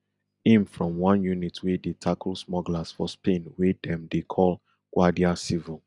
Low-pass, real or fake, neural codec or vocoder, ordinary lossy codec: 10.8 kHz; real; none; none